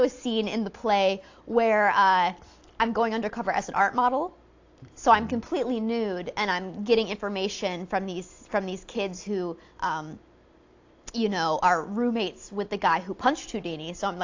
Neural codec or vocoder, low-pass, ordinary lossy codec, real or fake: none; 7.2 kHz; AAC, 48 kbps; real